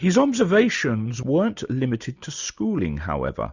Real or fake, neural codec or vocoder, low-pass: real; none; 7.2 kHz